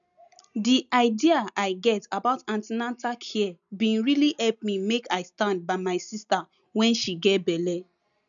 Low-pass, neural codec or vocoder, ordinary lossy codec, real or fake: 7.2 kHz; none; none; real